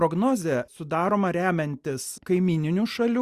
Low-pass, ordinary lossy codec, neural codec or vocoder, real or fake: 14.4 kHz; Opus, 64 kbps; none; real